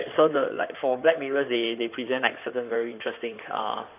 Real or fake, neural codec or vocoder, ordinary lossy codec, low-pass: fake; codec, 16 kHz in and 24 kHz out, 2.2 kbps, FireRedTTS-2 codec; none; 3.6 kHz